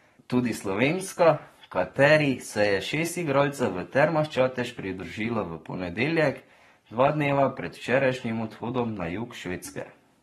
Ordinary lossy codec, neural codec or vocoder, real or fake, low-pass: AAC, 32 kbps; codec, 44.1 kHz, 7.8 kbps, Pupu-Codec; fake; 19.8 kHz